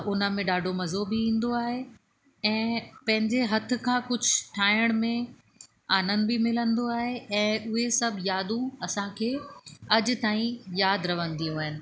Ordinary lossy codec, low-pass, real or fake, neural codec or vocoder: none; none; real; none